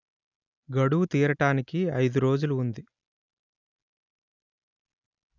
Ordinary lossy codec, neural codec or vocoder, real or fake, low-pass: none; none; real; 7.2 kHz